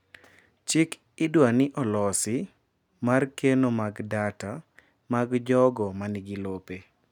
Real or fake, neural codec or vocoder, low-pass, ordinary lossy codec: real; none; 19.8 kHz; none